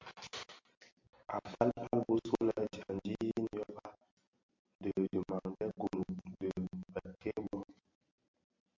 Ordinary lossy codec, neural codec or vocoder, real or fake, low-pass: MP3, 48 kbps; none; real; 7.2 kHz